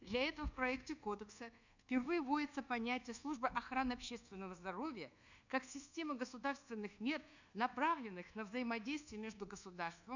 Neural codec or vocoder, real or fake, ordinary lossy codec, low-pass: codec, 24 kHz, 1.2 kbps, DualCodec; fake; none; 7.2 kHz